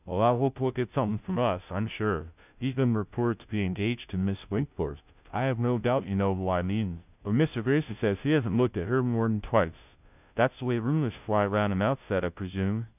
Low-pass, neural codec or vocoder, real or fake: 3.6 kHz; codec, 16 kHz, 0.5 kbps, FunCodec, trained on Chinese and English, 25 frames a second; fake